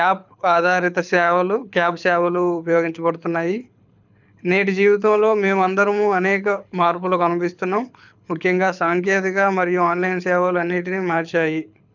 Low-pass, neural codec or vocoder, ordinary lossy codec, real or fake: 7.2 kHz; codec, 24 kHz, 6 kbps, HILCodec; none; fake